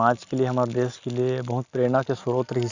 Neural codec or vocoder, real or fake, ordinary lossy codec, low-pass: none; real; Opus, 64 kbps; 7.2 kHz